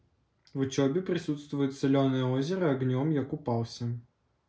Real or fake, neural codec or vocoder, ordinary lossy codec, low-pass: real; none; none; none